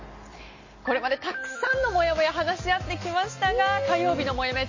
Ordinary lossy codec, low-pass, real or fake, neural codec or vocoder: MP3, 32 kbps; 7.2 kHz; real; none